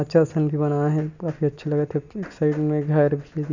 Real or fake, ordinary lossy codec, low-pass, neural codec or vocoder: real; none; 7.2 kHz; none